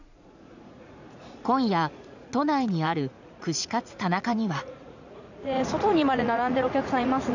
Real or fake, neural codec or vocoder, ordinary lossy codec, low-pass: fake; vocoder, 44.1 kHz, 80 mel bands, Vocos; none; 7.2 kHz